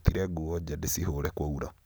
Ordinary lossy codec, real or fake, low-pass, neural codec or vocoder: none; real; none; none